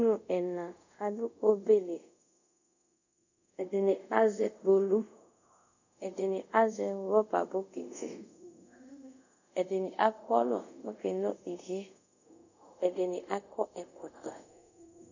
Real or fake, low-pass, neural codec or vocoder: fake; 7.2 kHz; codec, 24 kHz, 0.5 kbps, DualCodec